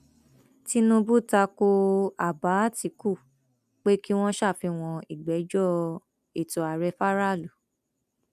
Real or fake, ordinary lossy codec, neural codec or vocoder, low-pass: real; none; none; 14.4 kHz